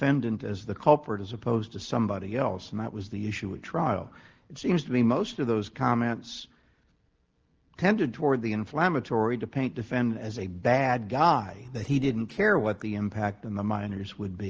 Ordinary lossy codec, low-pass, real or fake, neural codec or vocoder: Opus, 16 kbps; 7.2 kHz; real; none